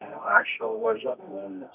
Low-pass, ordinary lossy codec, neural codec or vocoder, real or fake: 3.6 kHz; none; codec, 24 kHz, 0.9 kbps, WavTokenizer, medium music audio release; fake